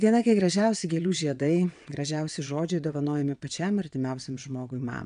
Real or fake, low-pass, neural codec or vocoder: fake; 9.9 kHz; vocoder, 22.05 kHz, 80 mel bands, WaveNeXt